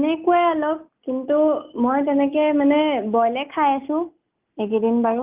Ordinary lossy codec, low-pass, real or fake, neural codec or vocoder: Opus, 24 kbps; 3.6 kHz; real; none